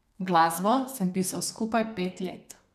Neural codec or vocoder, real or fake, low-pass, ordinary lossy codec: codec, 32 kHz, 1.9 kbps, SNAC; fake; 14.4 kHz; none